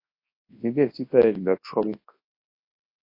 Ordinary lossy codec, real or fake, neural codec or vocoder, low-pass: MP3, 32 kbps; fake; codec, 24 kHz, 0.9 kbps, WavTokenizer, large speech release; 5.4 kHz